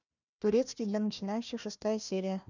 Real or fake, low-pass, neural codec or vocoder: fake; 7.2 kHz; codec, 16 kHz, 1 kbps, FunCodec, trained on Chinese and English, 50 frames a second